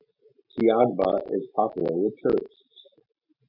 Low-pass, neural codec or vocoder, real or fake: 5.4 kHz; none; real